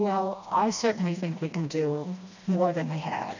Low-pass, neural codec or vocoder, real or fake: 7.2 kHz; codec, 16 kHz, 1 kbps, FreqCodec, smaller model; fake